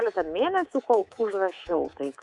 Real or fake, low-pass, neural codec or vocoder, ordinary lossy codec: fake; 10.8 kHz; codec, 24 kHz, 3.1 kbps, DualCodec; Opus, 64 kbps